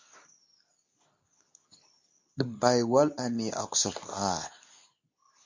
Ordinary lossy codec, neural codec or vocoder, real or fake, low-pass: MP3, 48 kbps; codec, 24 kHz, 0.9 kbps, WavTokenizer, medium speech release version 2; fake; 7.2 kHz